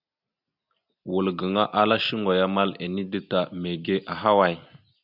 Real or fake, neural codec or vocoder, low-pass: real; none; 5.4 kHz